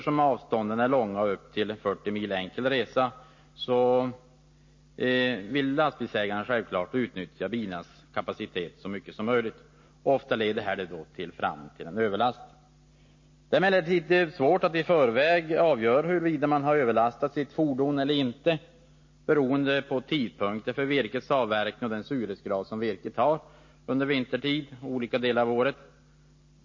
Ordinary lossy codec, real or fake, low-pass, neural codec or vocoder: MP3, 32 kbps; real; 7.2 kHz; none